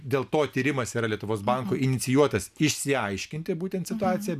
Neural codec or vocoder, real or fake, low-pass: none; real; 14.4 kHz